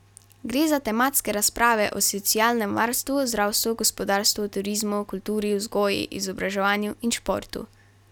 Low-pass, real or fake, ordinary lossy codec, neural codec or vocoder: 19.8 kHz; real; none; none